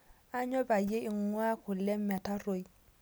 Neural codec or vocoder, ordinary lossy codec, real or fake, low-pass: none; none; real; none